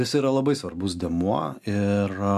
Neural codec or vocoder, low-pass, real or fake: none; 14.4 kHz; real